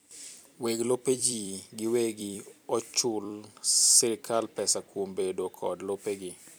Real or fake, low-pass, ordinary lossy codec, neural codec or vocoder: fake; none; none; vocoder, 44.1 kHz, 128 mel bands every 256 samples, BigVGAN v2